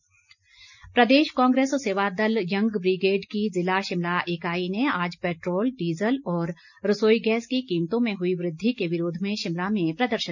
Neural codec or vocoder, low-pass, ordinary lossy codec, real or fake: none; 7.2 kHz; none; real